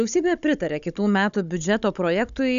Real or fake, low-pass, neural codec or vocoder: fake; 7.2 kHz; codec, 16 kHz, 16 kbps, FunCodec, trained on Chinese and English, 50 frames a second